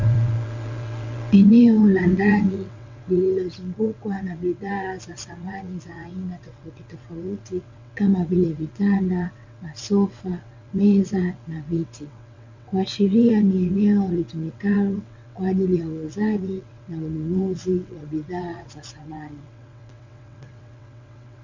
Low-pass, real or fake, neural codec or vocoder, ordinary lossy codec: 7.2 kHz; fake; vocoder, 22.05 kHz, 80 mel bands, WaveNeXt; MP3, 64 kbps